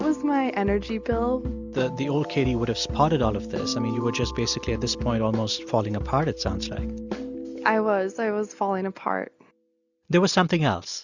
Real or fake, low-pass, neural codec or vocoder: real; 7.2 kHz; none